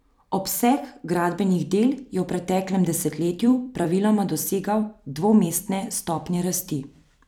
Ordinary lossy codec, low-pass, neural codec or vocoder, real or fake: none; none; vocoder, 44.1 kHz, 128 mel bands every 256 samples, BigVGAN v2; fake